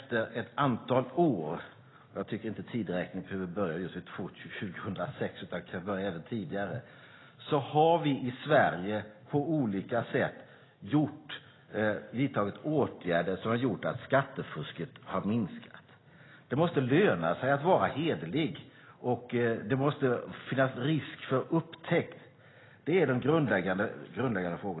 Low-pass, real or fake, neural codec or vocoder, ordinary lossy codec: 7.2 kHz; real; none; AAC, 16 kbps